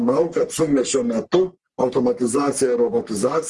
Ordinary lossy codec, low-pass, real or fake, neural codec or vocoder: Opus, 24 kbps; 10.8 kHz; fake; codec, 44.1 kHz, 3.4 kbps, Pupu-Codec